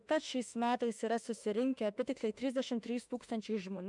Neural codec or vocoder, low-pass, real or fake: autoencoder, 48 kHz, 32 numbers a frame, DAC-VAE, trained on Japanese speech; 10.8 kHz; fake